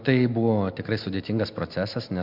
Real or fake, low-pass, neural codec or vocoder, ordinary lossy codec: real; 5.4 kHz; none; MP3, 48 kbps